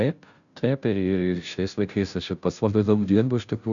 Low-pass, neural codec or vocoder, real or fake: 7.2 kHz; codec, 16 kHz, 0.5 kbps, FunCodec, trained on Chinese and English, 25 frames a second; fake